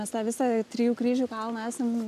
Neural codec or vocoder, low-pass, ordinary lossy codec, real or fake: none; 14.4 kHz; MP3, 96 kbps; real